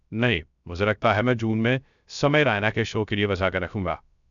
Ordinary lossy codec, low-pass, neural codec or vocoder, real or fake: none; 7.2 kHz; codec, 16 kHz, 0.3 kbps, FocalCodec; fake